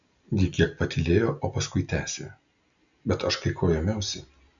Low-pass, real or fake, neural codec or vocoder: 7.2 kHz; real; none